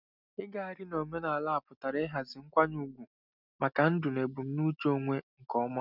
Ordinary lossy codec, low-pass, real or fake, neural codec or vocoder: none; 5.4 kHz; real; none